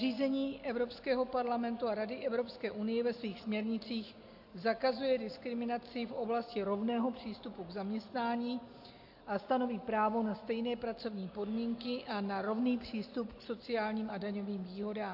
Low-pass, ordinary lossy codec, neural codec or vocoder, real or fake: 5.4 kHz; AAC, 48 kbps; none; real